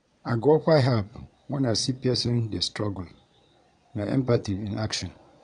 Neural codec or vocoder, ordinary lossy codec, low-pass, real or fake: vocoder, 22.05 kHz, 80 mel bands, Vocos; none; 9.9 kHz; fake